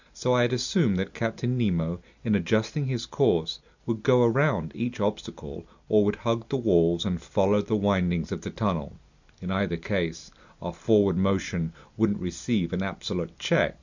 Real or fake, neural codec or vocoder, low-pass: real; none; 7.2 kHz